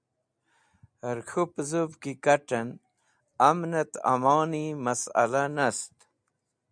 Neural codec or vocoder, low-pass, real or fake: none; 9.9 kHz; real